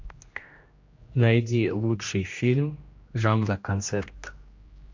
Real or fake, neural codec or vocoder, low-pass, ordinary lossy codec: fake; codec, 16 kHz, 1 kbps, X-Codec, HuBERT features, trained on general audio; 7.2 kHz; MP3, 48 kbps